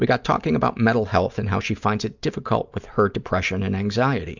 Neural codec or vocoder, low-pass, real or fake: none; 7.2 kHz; real